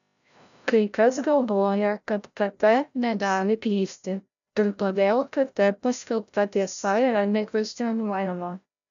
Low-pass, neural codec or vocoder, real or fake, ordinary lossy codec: 7.2 kHz; codec, 16 kHz, 0.5 kbps, FreqCodec, larger model; fake; MP3, 96 kbps